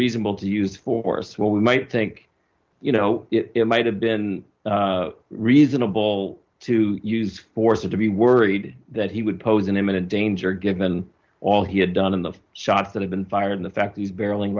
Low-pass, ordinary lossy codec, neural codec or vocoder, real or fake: 7.2 kHz; Opus, 32 kbps; none; real